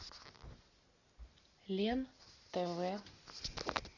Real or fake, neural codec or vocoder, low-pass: real; none; 7.2 kHz